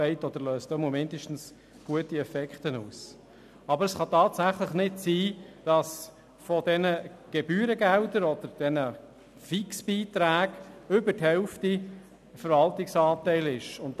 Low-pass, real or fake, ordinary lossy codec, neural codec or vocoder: 14.4 kHz; real; none; none